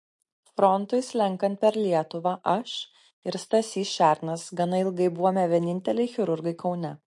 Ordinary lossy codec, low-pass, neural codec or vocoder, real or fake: MP3, 48 kbps; 10.8 kHz; none; real